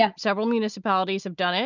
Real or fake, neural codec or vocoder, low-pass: real; none; 7.2 kHz